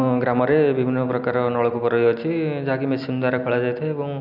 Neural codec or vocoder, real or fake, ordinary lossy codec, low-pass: none; real; none; 5.4 kHz